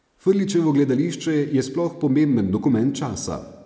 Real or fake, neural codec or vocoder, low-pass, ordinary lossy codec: real; none; none; none